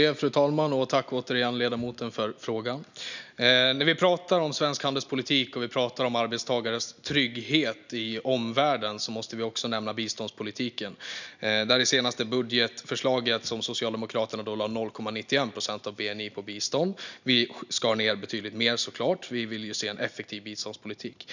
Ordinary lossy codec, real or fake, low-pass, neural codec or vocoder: none; real; 7.2 kHz; none